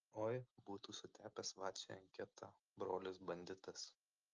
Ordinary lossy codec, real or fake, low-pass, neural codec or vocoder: Opus, 24 kbps; real; 7.2 kHz; none